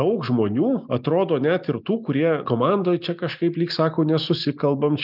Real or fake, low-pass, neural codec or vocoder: real; 5.4 kHz; none